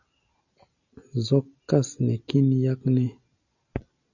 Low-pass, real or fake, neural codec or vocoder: 7.2 kHz; real; none